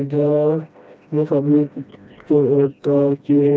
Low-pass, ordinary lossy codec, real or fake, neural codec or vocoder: none; none; fake; codec, 16 kHz, 1 kbps, FreqCodec, smaller model